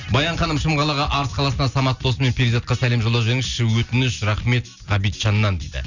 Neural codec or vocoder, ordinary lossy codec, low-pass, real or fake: none; none; 7.2 kHz; real